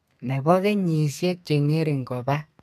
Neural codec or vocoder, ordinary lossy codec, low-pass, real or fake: codec, 32 kHz, 1.9 kbps, SNAC; none; 14.4 kHz; fake